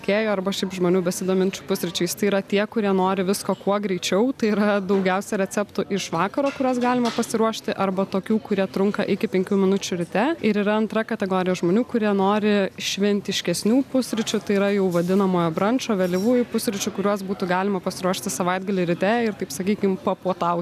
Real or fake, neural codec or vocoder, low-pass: real; none; 14.4 kHz